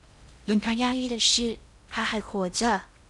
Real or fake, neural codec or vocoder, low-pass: fake; codec, 16 kHz in and 24 kHz out, 0.6 kbps, FocalCodec, streaming, 4096 codes; 10.8 kHz